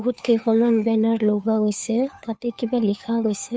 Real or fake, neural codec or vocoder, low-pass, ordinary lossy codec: fake; codec, 16 kHz, 2 kbps, FunCodec, trained on Chinese and English, 25 frames a second; none; none